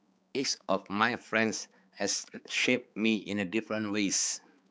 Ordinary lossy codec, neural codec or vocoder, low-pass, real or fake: none; codec, 16 kHz, 4 kbps, X-Codec, HuBERT features, trained on balanced general audio; none; fake